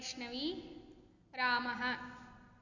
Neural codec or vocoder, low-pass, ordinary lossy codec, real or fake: none; 7.2 kHz; none; real